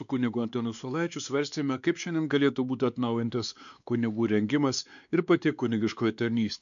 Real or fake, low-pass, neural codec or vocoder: fake; 7.2 kHz; codec, 16 kHz, 2 kbps, X-Codec, WavLM features, trained on Multilingual LibriSpeech